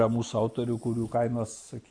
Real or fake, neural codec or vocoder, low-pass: real; none; 9.9 kHz